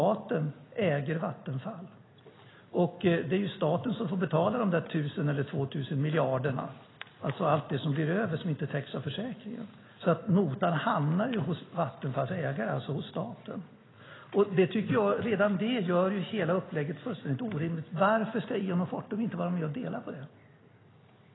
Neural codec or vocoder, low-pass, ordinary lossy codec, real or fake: none; 7.2 kHz; AAC, 16 kbps; real